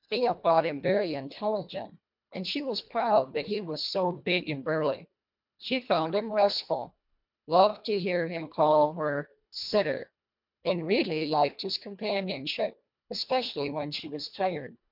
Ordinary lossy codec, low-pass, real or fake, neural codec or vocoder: MP3, 48 kbps; 5.4 kHz; fake; codec, 24 kHz, 1.5 kbps, HILCodec